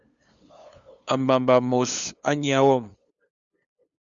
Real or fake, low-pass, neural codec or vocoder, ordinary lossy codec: fake; 7.2 kHz; codec, 16 kHz, 2 kbps, FunCodec, trained on LibriTTS, 25 frames a second; Opus, 64 kbps